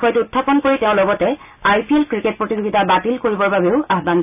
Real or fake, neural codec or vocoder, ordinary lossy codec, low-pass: real; none; none; 3.6 kHz